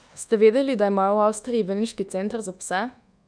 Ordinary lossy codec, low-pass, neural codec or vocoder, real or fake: none; 9.9 kHz; codec, 24 kHz, 1.2 kbps, DualCodec; fake